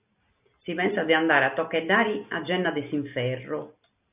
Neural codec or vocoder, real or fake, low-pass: none; real; 3.6 kHz